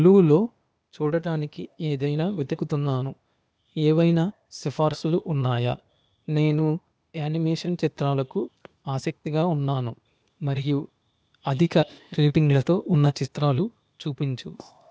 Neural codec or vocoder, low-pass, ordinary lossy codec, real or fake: codec, 16 kHz, 0.8 kbps, ZipCodec; none; none; fake